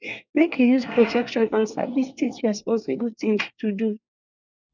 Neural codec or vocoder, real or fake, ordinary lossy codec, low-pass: codec, 24 kHz, 1 kbps, SNAC; fake; none; 7.2 kHz